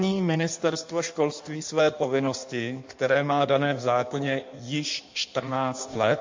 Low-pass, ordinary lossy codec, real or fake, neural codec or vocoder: 7.2 kHz; MP3, 48 kbps; fake; codec, 16 kHz in and 24 kHz out, 1.1 kbps, FireRedTTS-2 codec